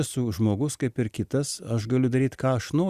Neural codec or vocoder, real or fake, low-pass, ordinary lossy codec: none; real; 14.4 kHz; Opus, 64 kbps